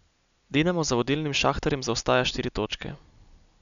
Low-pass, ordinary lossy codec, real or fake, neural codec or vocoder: 7.2 kHz; none; real; none